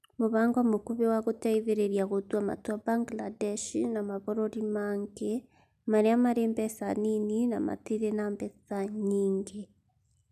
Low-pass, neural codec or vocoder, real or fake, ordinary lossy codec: 14.4 kHz; none; real; none